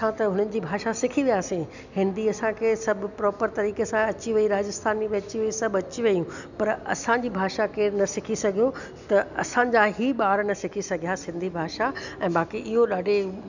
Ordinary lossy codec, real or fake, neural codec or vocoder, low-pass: none; real; none; 7.2 kHz